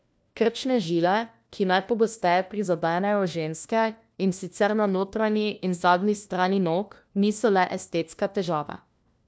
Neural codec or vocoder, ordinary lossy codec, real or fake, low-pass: codec, 16 kHz, 1 kbps, FunCodec, trained on LibriTTS, 50 frames a second; none; fake; none